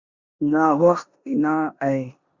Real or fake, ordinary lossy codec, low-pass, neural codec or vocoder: fake; Opus, 64 kbps; 7.2 kHz; codec, 16 kHz in and 24 kHz out, 0.9 kbps, LongCat-Audio-Codec, four codebook decoder